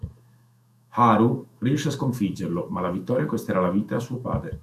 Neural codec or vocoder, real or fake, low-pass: autoencoder, 48 kHz, 128 numbers a frame, DAC-VAE, trained on Japanese speech; fake; 14.4 kHz